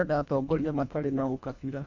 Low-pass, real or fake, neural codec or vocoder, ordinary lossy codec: 7.2 kHz; fake; codec, 24 kHz, 1.5 kbps, HILCodec; MP3, 64 kbps